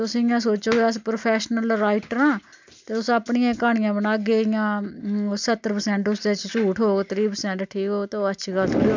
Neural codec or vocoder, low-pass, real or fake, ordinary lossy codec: none; 7.2 kHz; real; MP3, 64 kbps